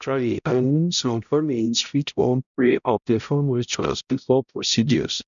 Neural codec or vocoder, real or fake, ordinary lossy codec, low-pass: codec, 16 kHz, 0.5 kbps, X-Codec, HuBERT features, trained on balanced general audio; fake; none; 7.2 kHz